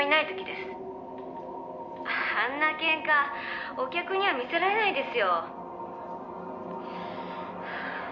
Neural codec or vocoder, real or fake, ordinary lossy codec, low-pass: none; real; none; 7.2 kHz